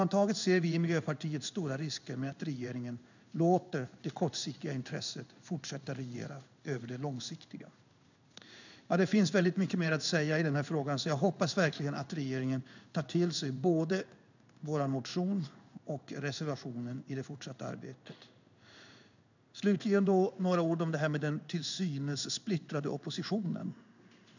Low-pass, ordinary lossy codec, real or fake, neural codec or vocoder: 7.2 kHz; none; fake; codec, 16 kHz in and 24 kHz out, 1 kbps, XY-Tokenizer